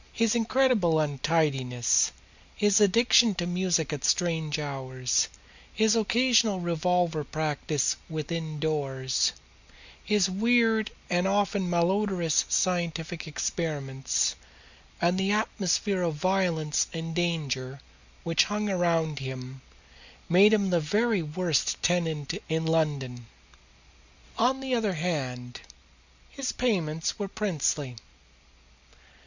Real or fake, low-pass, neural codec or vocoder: real; 7.2 kHz; none